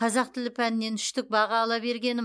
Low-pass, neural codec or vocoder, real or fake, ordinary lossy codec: none; none; real; none